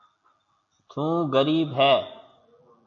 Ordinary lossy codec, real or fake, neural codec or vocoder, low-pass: AAC, 48 kbps; real; none; 7.2 kHz